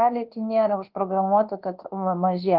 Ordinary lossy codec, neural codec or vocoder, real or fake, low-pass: Opus, 16 kbps; codec, 24 kHz, 1.2 kbps, DualCodec; fake; 5.4 kHz